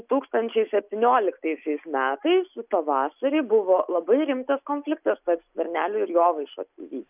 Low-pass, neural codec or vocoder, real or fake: 3.6 kHz; none; real